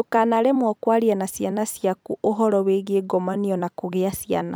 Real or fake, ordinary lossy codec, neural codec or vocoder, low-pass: fake; none; vocoder, 44.1 kHz, 128 mel bands every 256 samples, BigVGAN v2; none